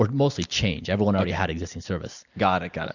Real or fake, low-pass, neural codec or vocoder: real; 7.2 kHz; none